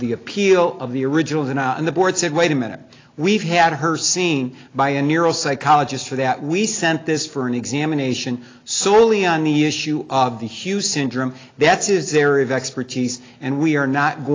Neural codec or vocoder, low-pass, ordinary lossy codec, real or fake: none; 7.2 kHz; AAC, 32 kbps; real